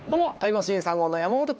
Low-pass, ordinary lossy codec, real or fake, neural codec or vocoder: none; none; fake; codec, 16 kHz, 2 kbps, X-Codec, HuBERT features, trained on LibriSpeech